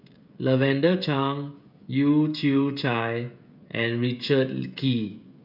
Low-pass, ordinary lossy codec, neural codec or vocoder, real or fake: 5.4 kHz; none; codec, 16 kHz, 16 kbps, FreqCodec, smaller model; fake